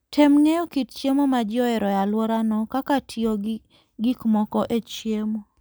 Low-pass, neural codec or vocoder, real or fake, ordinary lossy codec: none; none; real; none